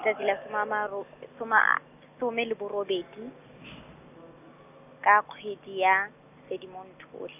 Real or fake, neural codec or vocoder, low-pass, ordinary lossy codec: real; none; 3.6 kHz; none